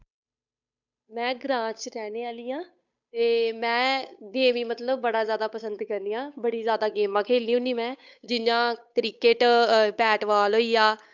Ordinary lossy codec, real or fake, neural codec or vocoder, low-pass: none; fake; codec, 16 kHz, 8 kbps, FunCodec, trained on Chinese and English, 25 frames a second; 7.2 kHz